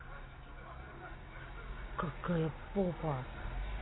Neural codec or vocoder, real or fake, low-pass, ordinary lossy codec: none; real; 7.2 kHz; AAC, 16 kbps